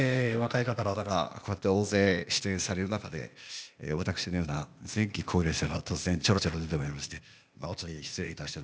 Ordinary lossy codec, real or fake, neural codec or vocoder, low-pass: none; fake; codec, 16 kHz, 0.8 kbps, ZipCodec; none